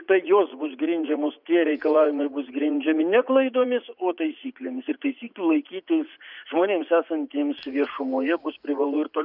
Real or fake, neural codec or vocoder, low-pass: fake; vocoder, 44.1 kHz, 80 mel bands, Vocos; 5.4 kHz